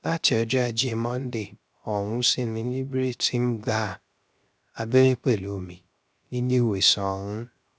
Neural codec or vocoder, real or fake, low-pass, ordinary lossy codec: codec, 16 kHz, 0.3 kbps, FocalCodec; fake; none; none